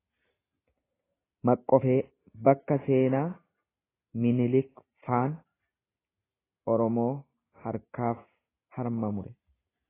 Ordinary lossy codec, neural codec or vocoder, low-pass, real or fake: AAC, 16 kbps; none; 3.6 kHz; real